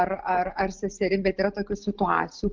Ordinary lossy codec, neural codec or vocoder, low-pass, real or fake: Opus, 32 kbps; none; 7.2 kHz; real